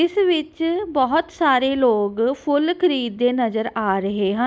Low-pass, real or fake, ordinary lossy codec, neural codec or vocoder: none; real; none; none